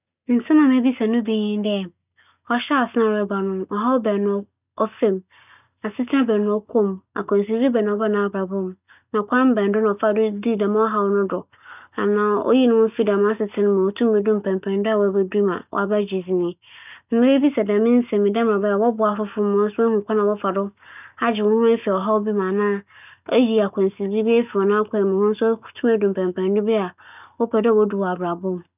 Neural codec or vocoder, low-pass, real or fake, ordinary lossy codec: none; 3.6 kHz; real; none